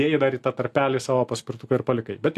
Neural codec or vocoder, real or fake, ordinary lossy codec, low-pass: none; real; AAC, 64 kbps; 14.4 kHz